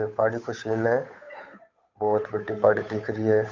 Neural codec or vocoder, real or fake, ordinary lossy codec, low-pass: codec, 16 kHz, 8 kbps, FunCodec, trained on Chinese and English, 25 frames a second; fake; MP3, 64 kbps; 7.2 kHz